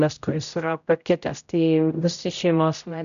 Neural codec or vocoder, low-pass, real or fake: codec, 16 kHz, 0.5 kbps, X-Codec, HuBERT features, trained on general audio; 7.2 kHz; fake